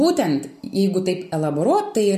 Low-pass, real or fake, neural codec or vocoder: 14.4 kHz; real; none